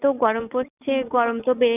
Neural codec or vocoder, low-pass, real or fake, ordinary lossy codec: none; 3.6 kHz; real; none